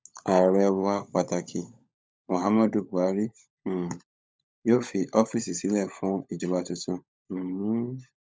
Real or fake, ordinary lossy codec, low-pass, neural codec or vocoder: fake; none; none; codec, 16 kHz, 16 kbps, FunCodec, trained on LibriTTS, 50 frames a second